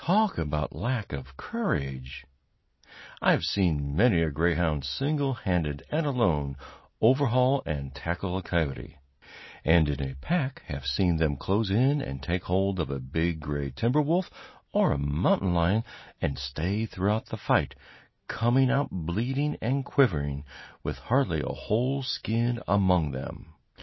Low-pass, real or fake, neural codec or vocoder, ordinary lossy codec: 7.2 kHz; real; none; MP3, 24 kbps